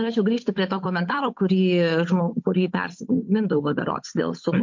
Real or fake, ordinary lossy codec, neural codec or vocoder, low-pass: fake; MP3, 48 kbps; codec, 16 kHz, 8 kbps, FunCodec, trained on Chinese and English, 25 frames a second; 7.2 kHz